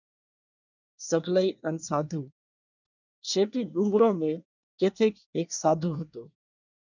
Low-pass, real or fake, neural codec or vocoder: 7.2 kHz; fake; codec, 24 kHz, 1 kbps, SNAC